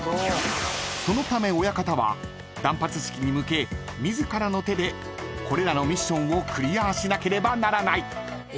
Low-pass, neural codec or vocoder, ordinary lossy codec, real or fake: none; none; none; real